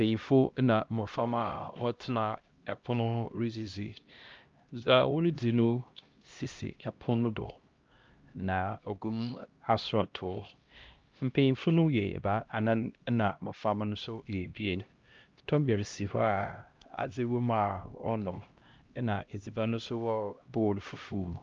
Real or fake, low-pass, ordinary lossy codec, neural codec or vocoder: fake; 7.2 kHz; Opus, 24 kbps; codec, 16 kHz, 1 kbps, X-Codec, HuBERT features, trained on LibriSpeech